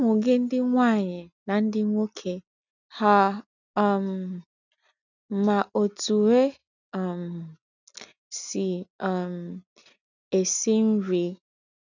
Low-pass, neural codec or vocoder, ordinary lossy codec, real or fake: 7.2 kHz; none; none; real